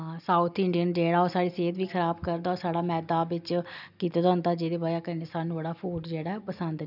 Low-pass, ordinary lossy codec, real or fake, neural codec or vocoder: 5.4 kHz; none; real; none